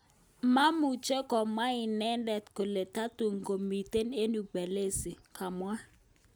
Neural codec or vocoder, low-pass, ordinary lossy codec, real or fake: none; none; none; real